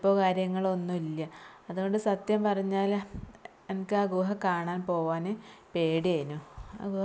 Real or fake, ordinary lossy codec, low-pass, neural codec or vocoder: real; none; none; none